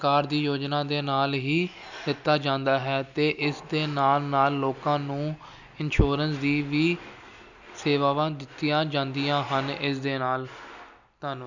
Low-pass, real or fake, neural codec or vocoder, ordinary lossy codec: 7.2 kHz; real; none; none